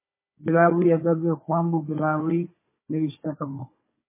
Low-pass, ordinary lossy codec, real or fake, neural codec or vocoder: 3.6 kHz; MP3, 16 kbps; fake; codec, 16 kHz, 4 kbps, FunCodec, trained on Chinese and English, 50 frames a second